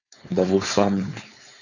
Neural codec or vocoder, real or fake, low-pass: codec, 16 kHz, 4.8 kbps, FACodec; fake; 7.2 kHz